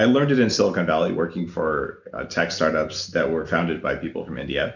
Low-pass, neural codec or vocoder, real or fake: 7.2 kHz; none; real